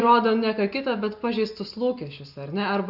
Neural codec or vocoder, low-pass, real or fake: none; 5.4 kHz; real